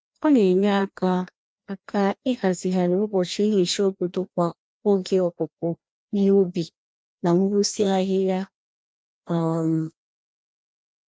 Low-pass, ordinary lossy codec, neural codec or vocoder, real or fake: none; none; codec, 16 kHz, 1 kbps, FreqCodec, larger model; fake